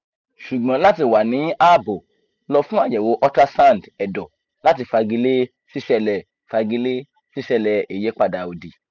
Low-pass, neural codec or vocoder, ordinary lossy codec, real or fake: 7.2 kHz; none; none; real